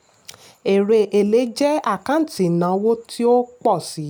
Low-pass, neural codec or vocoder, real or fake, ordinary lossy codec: 19.8 kHz; none; real; none